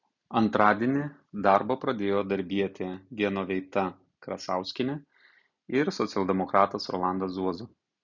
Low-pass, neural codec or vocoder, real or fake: 7.2 kHz; none; real